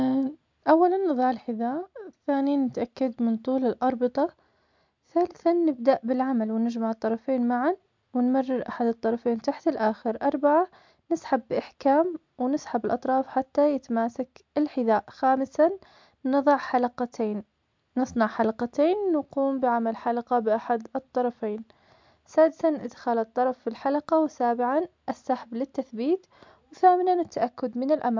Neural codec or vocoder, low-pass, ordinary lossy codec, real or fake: none; 7.2 kHz; MP3, 64 kbps; real